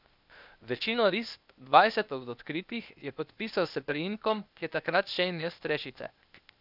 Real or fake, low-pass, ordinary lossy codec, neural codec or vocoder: fake; 5.4 kHz; none; codec, 16 kHz, 0.8 kbps, ZipCodec